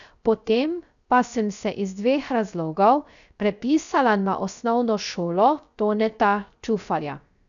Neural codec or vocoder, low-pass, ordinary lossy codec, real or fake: codec, 16 kHz, 0.3 kbps, FocalCodec; 7.2 kHz; none; fake